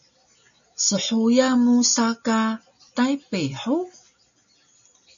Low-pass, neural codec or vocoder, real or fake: 7.2 kHz; none; real